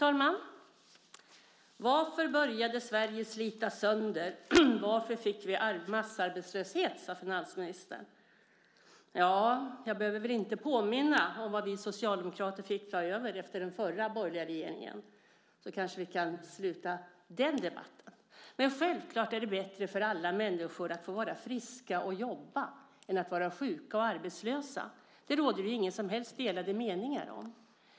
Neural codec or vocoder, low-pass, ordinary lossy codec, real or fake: none; none; none; real